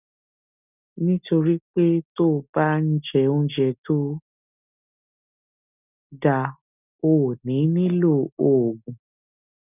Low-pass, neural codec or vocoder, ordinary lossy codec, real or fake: 3.6 kHz; none; none; real